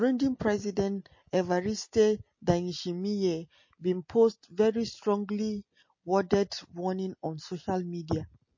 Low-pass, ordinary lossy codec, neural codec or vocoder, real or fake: 7.2 kHz; MP3, 32 kbps; none; real